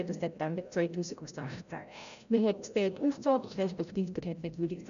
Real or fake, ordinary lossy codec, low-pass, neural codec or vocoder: fake; none; 7.2 kHz; codec, 16 kHz, 0.5 kbps, FreqCodec, larger model